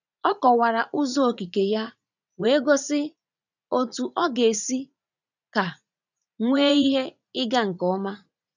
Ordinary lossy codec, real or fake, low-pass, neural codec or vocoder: none; fake; 7.2 kHz; vocoder, 44.1 kHz, 80 mel bands, Vocos